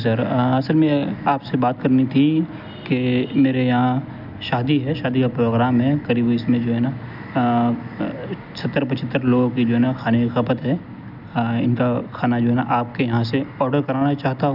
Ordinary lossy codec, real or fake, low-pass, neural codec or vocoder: none; real; 5.4 kHz; none